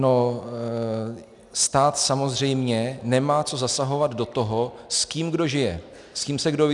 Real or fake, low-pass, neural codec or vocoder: real; 10.8 kHz; none